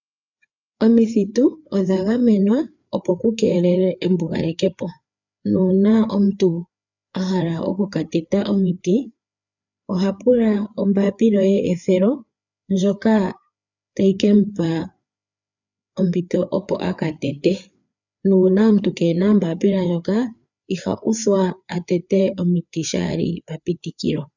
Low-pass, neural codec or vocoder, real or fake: 7.2 kHz; codec, 16 kHz, 4 kbps, FreqCodec, larger model; fake